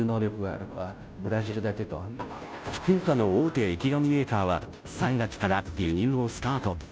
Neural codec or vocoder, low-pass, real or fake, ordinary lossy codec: codec, 16 kHz, 0.5 kbps, FunCodec, trained on Chinese and English, 25 frames a second; none; fake; none